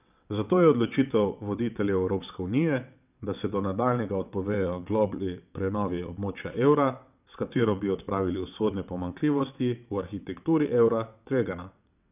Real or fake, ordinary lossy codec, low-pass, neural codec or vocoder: fake; none; 3.6 kHz; vocoder, 22.05 kHz, 80 mel bands, WaveNeXt